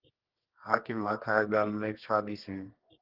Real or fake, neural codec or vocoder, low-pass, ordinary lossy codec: fake; codec, 24 kHz, 0.9 kbps, WavTokenizer, medium music audio release; 5.4 kHz; Opus, 16 kbps